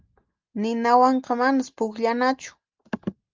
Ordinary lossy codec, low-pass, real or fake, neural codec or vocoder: Opus, 32 kbps; 7.2 kHz; real; none